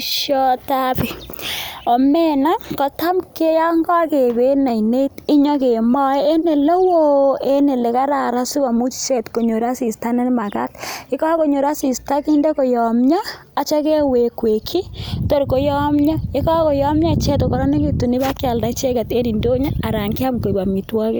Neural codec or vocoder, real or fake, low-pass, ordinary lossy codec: none; real; none; none